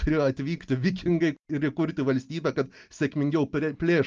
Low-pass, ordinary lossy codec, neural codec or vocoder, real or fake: 7.2 kHz; Opus, 24 kbps; none; real